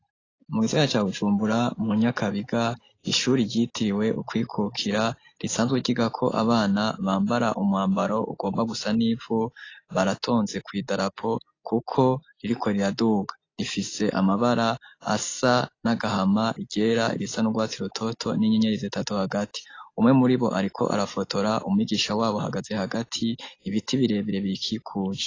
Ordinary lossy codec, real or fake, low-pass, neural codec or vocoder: AAC, 32 kbps; real; 7.2 kHz; none